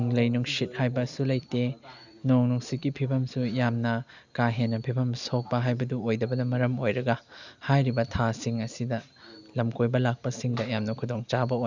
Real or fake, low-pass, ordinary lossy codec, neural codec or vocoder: real; 7.2 kHz; none; none